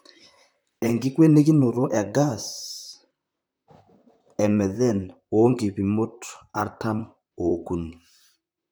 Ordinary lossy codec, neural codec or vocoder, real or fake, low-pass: none; vocoder, 44.1 kHz, 128 mel bands, Pupu-Vocoder; fake; none